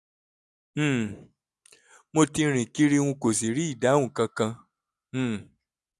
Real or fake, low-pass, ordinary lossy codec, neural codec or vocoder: real; none; none; none